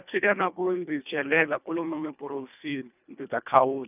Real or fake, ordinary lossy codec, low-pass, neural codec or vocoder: fake; none; 3.6 kHz; codec, 24 kHz, 1.5 kbps, HILCodec